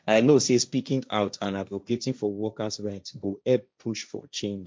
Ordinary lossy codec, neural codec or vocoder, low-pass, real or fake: none; codec, 16 kHz, 1.1 kbps, Voila-Tokenizer; none; fake